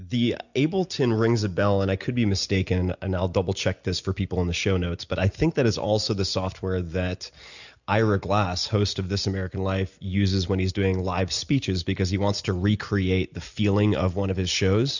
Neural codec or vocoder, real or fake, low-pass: none; real; 7.2 kHz